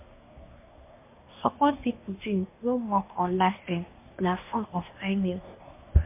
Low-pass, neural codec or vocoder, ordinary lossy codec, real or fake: 3.6 kHz; codec, 24 kHz, 1 kbps, SNAC; MP3, 24 kbps; fake